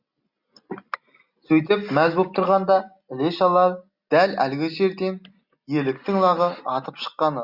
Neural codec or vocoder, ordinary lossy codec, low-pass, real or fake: none; Opus, 64 kbps; 5.4 kHz; real